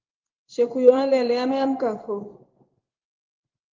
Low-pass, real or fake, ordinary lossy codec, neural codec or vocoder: 7.2 kHz; fake; Opus, 32 kbps; codec, 16 kHz in and 24 kHz out, 1 kbps, XY-Tokenizer